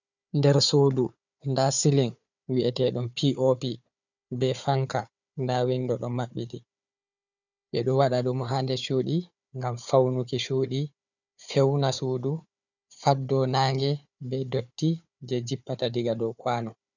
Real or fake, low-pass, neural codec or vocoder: fake; 7.2 kHz; codec, 16 kHz, 4 kbps, FunCodec, trained on Chinese and English, 50 frames a second